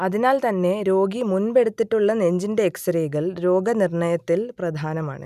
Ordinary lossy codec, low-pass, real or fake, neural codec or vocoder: none; 14.4 kHz; real; none